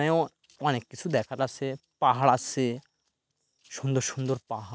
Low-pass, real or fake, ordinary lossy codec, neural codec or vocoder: none; real; none; none